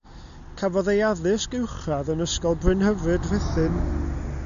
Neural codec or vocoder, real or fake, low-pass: none; real; 7.2 kHz